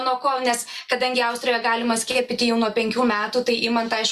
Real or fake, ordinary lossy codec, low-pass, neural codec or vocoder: real; Opus, 64 kbps; 14.4 kHz; none